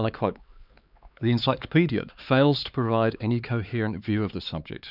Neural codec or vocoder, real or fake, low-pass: codec, 16 kHz, 4 kbps, X-Codec, HuBERT features, trained on LibriSpeech; fake; 5.4 kHz